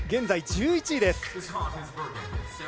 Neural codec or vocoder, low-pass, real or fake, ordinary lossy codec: none; none; real; none